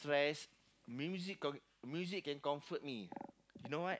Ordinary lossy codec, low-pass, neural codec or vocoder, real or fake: none; none; none; real